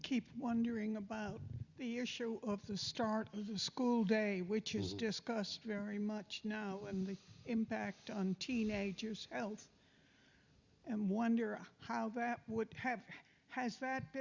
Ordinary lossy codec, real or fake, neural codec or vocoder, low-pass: Opus, 64 kbps; real; none; 7.2 kHz